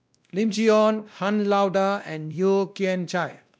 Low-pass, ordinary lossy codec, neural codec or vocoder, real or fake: none; none; codec, 16 kHz, 1 kbps, X-Codec, WavLM features, trained on Multilingual LibriSpeech; fake